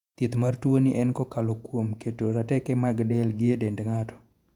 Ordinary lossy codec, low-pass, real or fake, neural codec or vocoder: none; 19.8 kHz; fake; vocoder, 48 kHz, 128 mel bands, Vocos